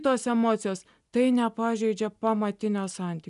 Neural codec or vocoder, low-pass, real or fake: none; 10.8 kHz; real